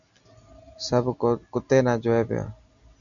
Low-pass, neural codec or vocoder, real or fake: 7.2 kHz; none; real